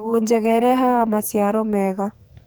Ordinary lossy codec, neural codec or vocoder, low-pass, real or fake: none; codec, 44.1 kHz, 2.6 kbps, SNAC; none; fake